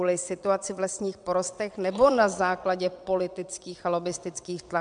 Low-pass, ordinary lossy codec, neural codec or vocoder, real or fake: 9.9 kHz; MP3, 96 kbps; vocoder, 22.05 kHz, 80 mel bands, WaveNeXt; fake